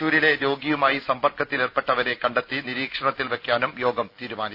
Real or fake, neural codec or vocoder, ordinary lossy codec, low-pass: real; none; none; 5.4 kHz